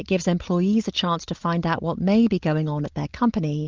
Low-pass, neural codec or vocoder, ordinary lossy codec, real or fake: 7.2 kHz; none; Opus, 32 kbps; real